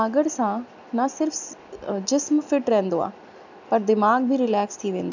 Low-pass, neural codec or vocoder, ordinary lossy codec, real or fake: 7.2 kHz; none; none; real